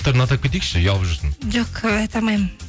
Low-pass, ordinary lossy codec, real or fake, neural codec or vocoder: none; none; real; none